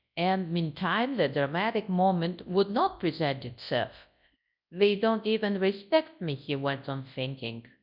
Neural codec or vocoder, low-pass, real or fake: codec, 24 kHz, 0.9 kbps, WavTokenizer, large speech release; 5.4 kHz; fake